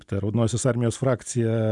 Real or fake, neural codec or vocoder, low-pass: real; none; 10.8 kHz